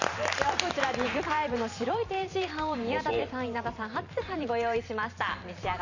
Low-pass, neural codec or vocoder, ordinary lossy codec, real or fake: 7.2 kHz; vocoder, 44.1 kHz, 128 mel bands every 512 samples, BigVGAN v2; none; fake